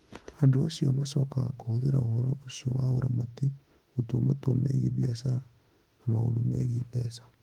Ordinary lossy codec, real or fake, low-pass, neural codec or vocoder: Opus, 24 kbps; fake; 19.8 kHz; autoencoder, 48 kHz, 32 numbers a frame, DAC-VAE, trained on Japanese speech